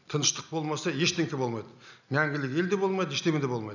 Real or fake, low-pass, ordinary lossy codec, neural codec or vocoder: real; 7.2 kHz; none; none